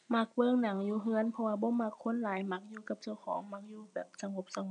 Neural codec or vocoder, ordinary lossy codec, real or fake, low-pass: none; none; real; 9.9 kHz